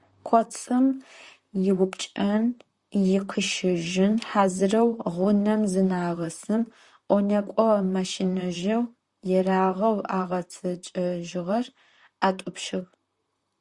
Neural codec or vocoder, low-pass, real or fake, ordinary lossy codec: vocoder, 44.1 kHz, 128 mel bands, Pupu-Vocoder; 10.8 kHz; fake; Opus, 64 kbps